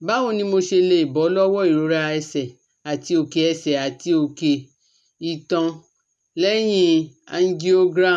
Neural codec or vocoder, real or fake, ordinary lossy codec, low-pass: none; real; none; none